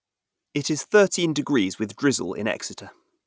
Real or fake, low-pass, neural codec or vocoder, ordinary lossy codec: real; none; none; none